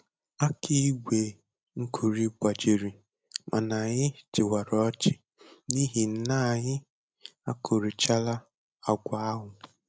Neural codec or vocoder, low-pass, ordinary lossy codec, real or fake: none; none; none; real